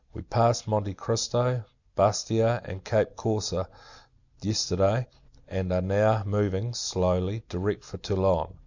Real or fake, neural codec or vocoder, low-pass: real; none; 7.2 kHz